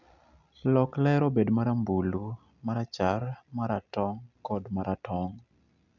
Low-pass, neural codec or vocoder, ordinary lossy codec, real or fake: 7.2 kHz; none; none; real